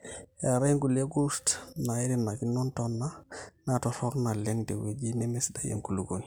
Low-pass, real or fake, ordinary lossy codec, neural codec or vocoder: none; real; none; none